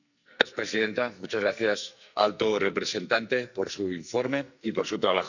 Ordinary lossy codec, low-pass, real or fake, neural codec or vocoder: none; 7.2 kHz; fake; codec, 44.1 kHz, 2.6 kbps, SNAC